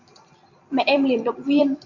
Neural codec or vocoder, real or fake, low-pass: vocoder, 44.1 kHz, 128 mel bands every 256 samples, BigVGAN v2; fake; 7.2 kHz